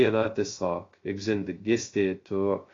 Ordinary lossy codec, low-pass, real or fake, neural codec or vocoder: AAC, 32 kbps; 7.2 kHz; fake; codec, 16 kHz, 0.2 kbps, FocalCodec